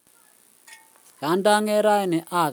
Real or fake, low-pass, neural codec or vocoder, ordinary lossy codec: real; none; none; none